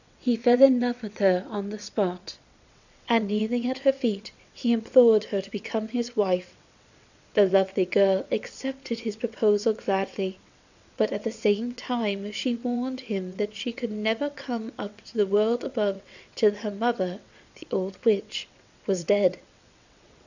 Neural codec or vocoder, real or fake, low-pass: vocoder, 22.05 kHz, 80 mel bands, WaveNeXt; fake; 7.2 kHz